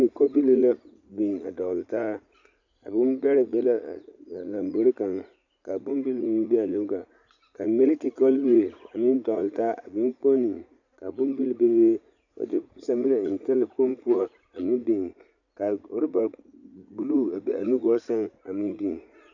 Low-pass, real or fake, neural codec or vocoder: 7.2 kHz; fake; vocoder, 44.1 kHz, 80 mel bands, Vocos